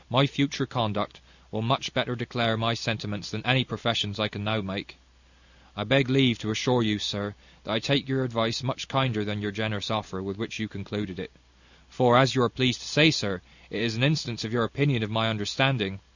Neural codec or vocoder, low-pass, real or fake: none; 7.2 kHz; real